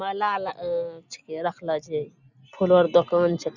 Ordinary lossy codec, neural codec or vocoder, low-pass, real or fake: none; none; 7.2 kHz; real